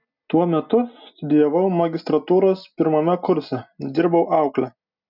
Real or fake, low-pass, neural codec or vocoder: real; 5.4 kHz; none